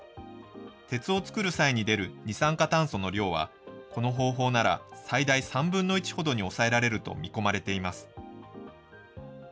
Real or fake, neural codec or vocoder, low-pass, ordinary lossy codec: real; none; none; none